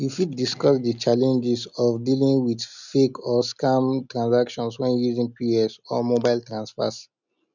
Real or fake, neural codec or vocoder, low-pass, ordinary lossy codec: real; none; 7.2 kHz; none